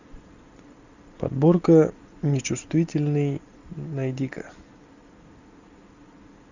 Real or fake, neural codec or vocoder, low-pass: real; none; 7.2 kHz